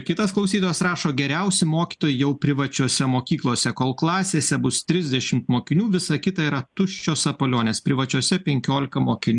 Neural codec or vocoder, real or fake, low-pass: none; real; 10.8 kHz